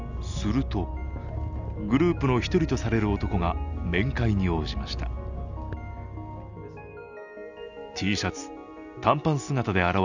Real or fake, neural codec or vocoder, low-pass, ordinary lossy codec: real; none; 7.2 kHz; none